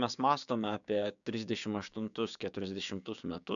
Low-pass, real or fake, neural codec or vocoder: 7.2 kHz; fake; codec, 16 kHz, 6 kbps, DAC